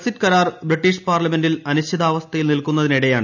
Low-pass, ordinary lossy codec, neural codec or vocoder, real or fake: 7.2 kHz; none; none; real